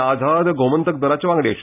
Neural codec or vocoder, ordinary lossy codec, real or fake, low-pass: none; none; real; 3.6 kHz